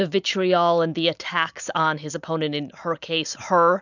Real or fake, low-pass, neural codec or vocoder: real; 7.2 kHz; none